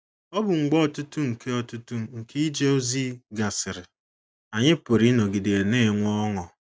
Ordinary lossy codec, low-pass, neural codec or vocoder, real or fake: none; none; none; real